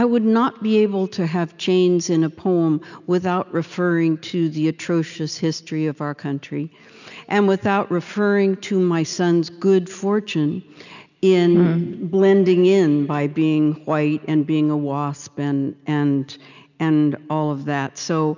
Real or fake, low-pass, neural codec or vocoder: real; 7.2 kHz; none